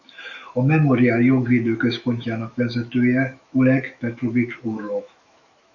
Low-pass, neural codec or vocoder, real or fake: 7.2 kHz; autoencoder, 48 kHz, 128 numbers a frame, DAC-VAE, trained on Japanese speech; fake